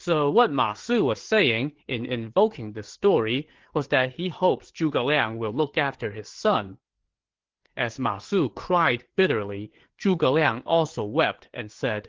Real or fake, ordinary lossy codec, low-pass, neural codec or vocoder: fake; Opus, 16 kbps; 7.2 kHz; codec, 16 kHz, 4 kbps, FreqCodec, larger model